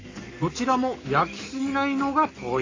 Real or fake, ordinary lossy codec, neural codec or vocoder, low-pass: fake; AAC, 32 kbps; codec, 44.1 kHz, 7.8 kbps, Pupu-Codec; 7.2 kHz